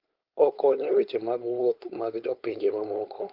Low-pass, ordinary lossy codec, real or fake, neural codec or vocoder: 5.4 kHz; Opus, 32 kbps; fake; codec, 16 kHz, 4.8 kbps, FACodec